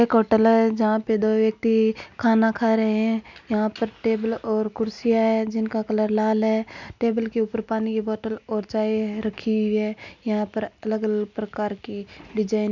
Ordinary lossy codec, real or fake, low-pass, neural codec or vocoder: none; real; 7.2 kHz; none